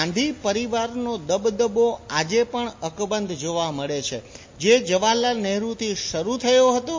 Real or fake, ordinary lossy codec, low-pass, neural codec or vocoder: real; MP3, 32 kbps; 7.2 kHz; none